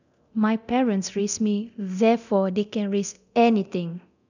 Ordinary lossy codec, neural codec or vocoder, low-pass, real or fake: none; codec, 24 kHz, 0.9 kbps, DualCodec; 7.2 kHz; fake